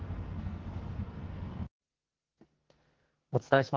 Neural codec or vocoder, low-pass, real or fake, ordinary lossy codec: codec, 44.1 kHz, 2.6 kbps, SNAC; 7.2 kHz; fake; Opus, 16 kbps